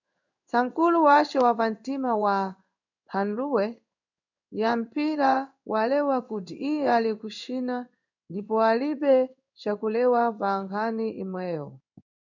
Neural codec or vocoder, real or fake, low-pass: codec, 16 kHz in and 24 kHz out, 1 kbps, XY-Tokenizer; fake; 7.2 kHz